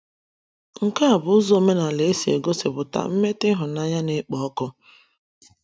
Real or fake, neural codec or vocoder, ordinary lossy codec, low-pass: real; none; none; none